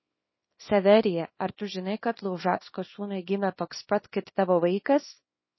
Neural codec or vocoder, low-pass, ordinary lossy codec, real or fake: codec, 24 kHz, 0.9 kbps, WavTokenizer, small release; 7.2 kHz; MP3, 24 kbps; fake